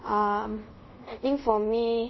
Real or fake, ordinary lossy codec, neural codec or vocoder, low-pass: fake; MP3, 24 kbps; codec, 24 kHz, 0.5 kbps, DualCodec; 7.2 kHz